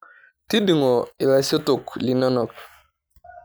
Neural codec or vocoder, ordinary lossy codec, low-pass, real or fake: none; none; none; real